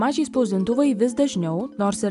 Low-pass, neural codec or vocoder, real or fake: 10.8 kHz; none; real